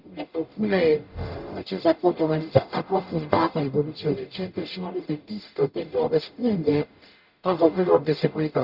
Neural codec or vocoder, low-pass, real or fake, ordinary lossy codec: codec, 44.1 kHz, 0.9 kbps, DAC; 5.4 kHz; fake; Opus, 64 kbps